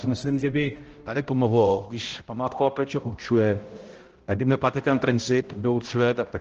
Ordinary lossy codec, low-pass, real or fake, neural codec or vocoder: Opus, 16 kbps; 7.2 kHz; fake; codec, 16 kHz, 0.5 kbps, X-Codec, HuBERT features, trained on balanced general audio